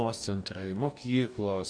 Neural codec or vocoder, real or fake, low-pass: codec, 44.1 kHz, 2.6 kbps, DAC; fake; 9.9 kHz